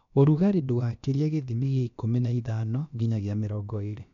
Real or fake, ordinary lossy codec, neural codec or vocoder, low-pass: fake; MP3, 96 kbps; codec, 16 kHz, about 1 kbps, DyCAST, with the encoder's durations; 7.2 kHz